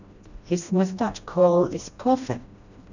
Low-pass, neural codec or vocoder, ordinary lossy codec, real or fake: 7.2 kHz; codec, 16 kHz, 1 kbps, FreqCodec, smaller model; none; fake